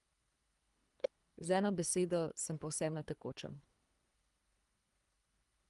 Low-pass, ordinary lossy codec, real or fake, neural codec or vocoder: 10.8 kHz; Opus, 32 kbps; fake; codec, 24 kHz, 3 kbps, HILCodec